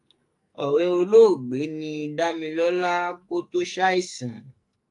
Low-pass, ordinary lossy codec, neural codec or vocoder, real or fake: 10.8 kHz; AAC, 64 kbps; codec, 44.1 kHz, 2.6 kbps, SNAC; fake